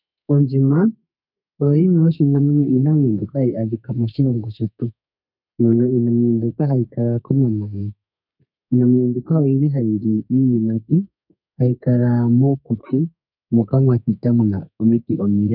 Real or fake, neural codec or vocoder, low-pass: fake; codec, 32 kHz, 1.9 kbps, SNAC; 5.4 kHz